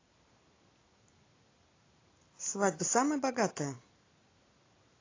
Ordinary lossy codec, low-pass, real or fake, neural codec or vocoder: AAC, 32 kbps; 7.2 kHz; real; none